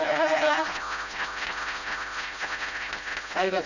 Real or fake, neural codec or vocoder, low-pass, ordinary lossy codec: fake; codec, 16 kHz, 0.5 kbps, FreqCodec, smaller model; 7.2 kHz; none